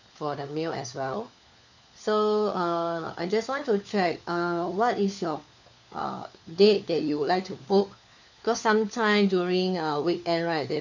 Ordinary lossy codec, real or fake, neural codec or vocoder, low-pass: none; fake; codec, 16 kHz, 4 kbps, FunCodec, trained on LibriTTS, 50 frames a second; 7.2 kHz